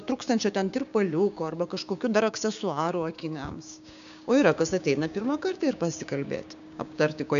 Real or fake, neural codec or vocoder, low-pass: fake; codec, 16 kHz, 6 kbps, DAC; 7.2 kHz